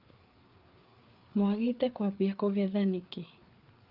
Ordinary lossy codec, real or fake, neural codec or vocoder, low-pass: Opus, 32 kbps; fake; codec, 16 kHz, 4 kbps, FreqCodec, larger model; 5.4 kHz